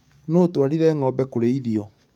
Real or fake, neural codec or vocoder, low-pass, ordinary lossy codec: fake; codec, 44.1 kHz, 7.8 kbps, DAC; 19.8 kHz; none